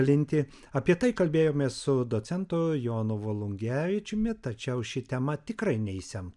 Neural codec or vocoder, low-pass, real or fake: none; 10.8 kHz; real